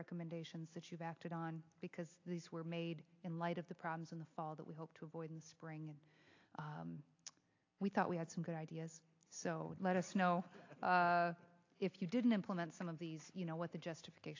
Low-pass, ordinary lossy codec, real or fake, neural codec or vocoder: 7.2 kHz; AAC, 48 kbps; real; none